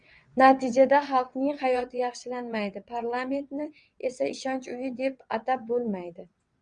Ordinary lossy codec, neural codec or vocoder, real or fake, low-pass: Opus, 64 kbps; vocoder, 22.05 kHz, 80 mel bands, WaveNeXt; fake; 9.9 kHz